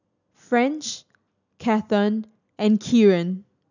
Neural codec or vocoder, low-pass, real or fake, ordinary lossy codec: none; 7.2 kHz; real; none